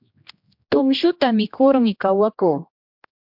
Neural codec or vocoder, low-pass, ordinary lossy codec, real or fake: codec, 16 kHz, 1 kbps, X-Codec, HuBERT features, trained on general audio; 5.4 kHz; MP3, 48 kbps; fake